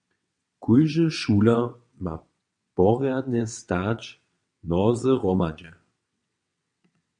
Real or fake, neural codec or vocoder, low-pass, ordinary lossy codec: fake; vocoder, 22.05 kHz, 80 mel bands, WaveNeXt; 9.9 kHz; MP3, 48 kbps